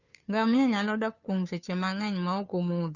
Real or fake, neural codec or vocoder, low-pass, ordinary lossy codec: fake; codec, 16 kHz, 2 kbps, FunCodec, trained on Chinese and English, 25 frames a second; 7.2 kHz; none